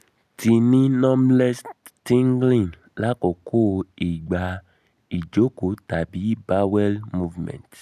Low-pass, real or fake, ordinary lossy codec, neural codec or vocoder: 14.4 kHz; real; none; none